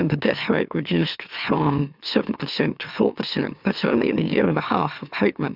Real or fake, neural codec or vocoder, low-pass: fake; autoencoder, 44.1 kHz, a latent of 192 numbers a frame, MeloTTS; 5.4 kHz